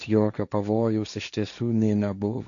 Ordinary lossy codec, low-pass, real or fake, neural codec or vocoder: MP3, 96 kbps; 7.2 kHz; fake; codec, 16 kHz, 1.1 kbps, Voila-Tokenizer